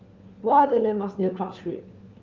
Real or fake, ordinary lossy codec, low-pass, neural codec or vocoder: fake; Opus, 24 kbps; 7.2 kHz; codec, 16 kHz, 4 kbps, FunCodec, trained on LibriTTS, 50 frames a second